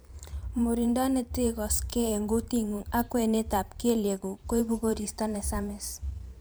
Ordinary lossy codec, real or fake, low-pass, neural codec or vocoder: none; fake; none; vocoder, 44.1 kHz, 128 mel bands every 512 samples, BigVGAN v2